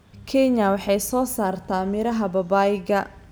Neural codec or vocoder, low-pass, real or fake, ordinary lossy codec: none; none; real; none